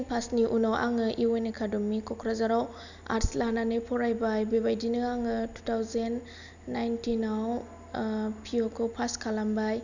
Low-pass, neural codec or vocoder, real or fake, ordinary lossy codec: 7.2 kHz; none; real; none